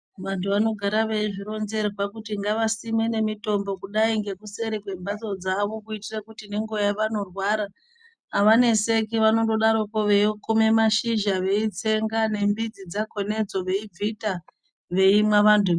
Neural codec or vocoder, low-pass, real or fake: none; 9.9 kHz; real